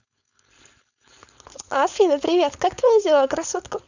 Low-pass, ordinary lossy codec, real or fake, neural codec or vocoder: 7.2 kHz; none; fake; codec, 16 kHz, 4.8 kbps, FACodec